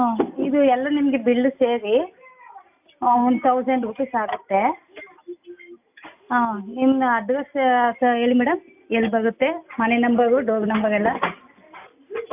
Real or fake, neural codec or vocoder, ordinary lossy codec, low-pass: real; none; AAC, 32 kbps; 3.6 kHz